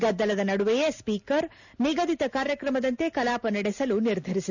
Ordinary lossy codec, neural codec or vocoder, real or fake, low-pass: none; none; real; 7.2 kHz